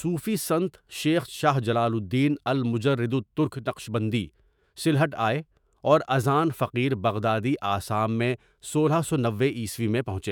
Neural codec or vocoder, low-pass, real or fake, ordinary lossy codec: autoencoder, 48 kHz, 128 numbers a frame, DAC-VAE, trained on Japanese speech; none; fake; none